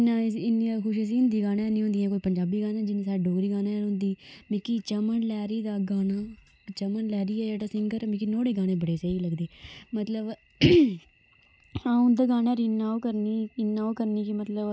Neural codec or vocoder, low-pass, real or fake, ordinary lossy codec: none; none; real; none